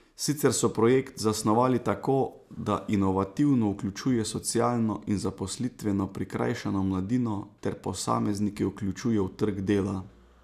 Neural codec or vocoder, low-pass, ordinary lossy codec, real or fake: none; 14.4 kHz; none; real